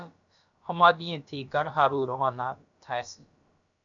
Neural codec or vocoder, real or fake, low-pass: codec, 16 kHz, about 1 kbps, DyCAST, with the encoder's durations; fake; 7.2 kHz